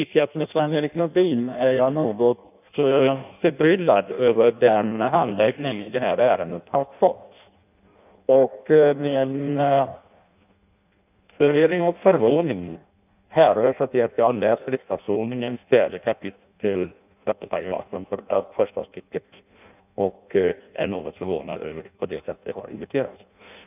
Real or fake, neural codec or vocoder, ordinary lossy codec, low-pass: fake; codec, 16 kHz in and 24 kHz out, 0.6 kbps, FireRedTTS-2 codec; none; 3.6 kHz